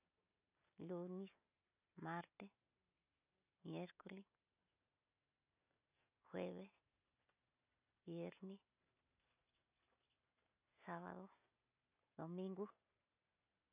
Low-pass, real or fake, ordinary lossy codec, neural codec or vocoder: 3.6 kHz; real; none; none